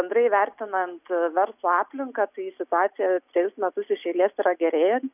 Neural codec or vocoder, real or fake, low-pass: none; real; 3.6 kHz